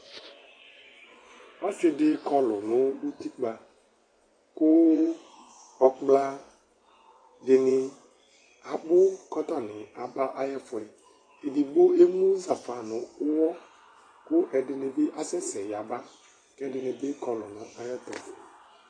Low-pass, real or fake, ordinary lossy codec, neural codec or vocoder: 9.9 kHz; fake; AAC, 32 kbps; vocoder, 24 kHz, 100 mel bands, Vocos